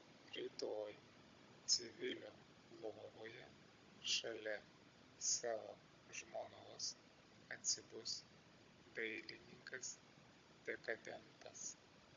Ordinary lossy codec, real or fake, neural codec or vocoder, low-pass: AAC, 64 kbps; fake; codec, 16 kHz, 16 kbps, FunCodec, trained on Chinese and English, 50 frames a second; 7.2 kHz